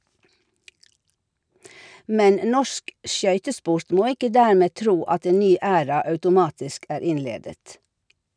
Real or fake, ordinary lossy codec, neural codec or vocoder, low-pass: real; none; none; 9.9 kHz